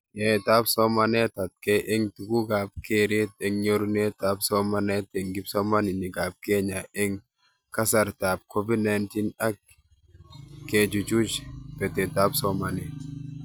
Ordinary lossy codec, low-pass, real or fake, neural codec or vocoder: none; none; real; none